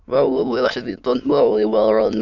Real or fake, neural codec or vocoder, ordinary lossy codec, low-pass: fake; autoencoder, 22.05 kHz, a latent of 192 numbers a frame, VITS, trained on many speakers; none; 7.2 kHz